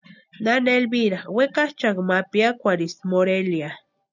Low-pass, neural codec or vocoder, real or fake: 7.2 kHz; none; real